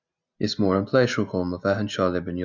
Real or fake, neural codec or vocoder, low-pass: real; none; 7.2 kHz